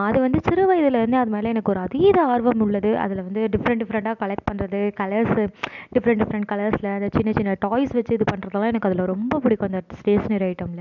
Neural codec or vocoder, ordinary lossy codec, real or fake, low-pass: none; none; real; 7.2 kHz